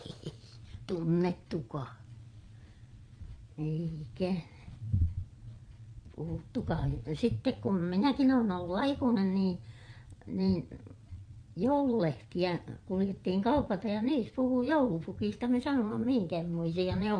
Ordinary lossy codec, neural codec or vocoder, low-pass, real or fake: MP3, 48 kbps; vocoder, 22.05 kHz, 80 mel bands, Vocos; 9.9 kHz; fake